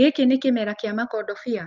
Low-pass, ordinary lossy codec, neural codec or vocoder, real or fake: 7.2 kHz; Opus, 32 kbps; none; real